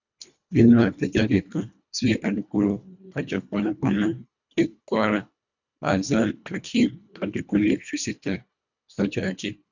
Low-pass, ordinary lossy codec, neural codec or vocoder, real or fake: 7.2 kHz; none; codec, 24 kHz, 1.5 kbps, HILCodec; fake